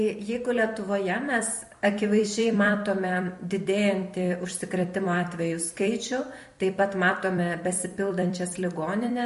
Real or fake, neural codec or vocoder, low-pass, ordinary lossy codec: fake; vocoder, 44.1 kHz, 128 mel bands every 256 samples, BigVGAN v2; 14.4 kHz; MP3, 48 kbps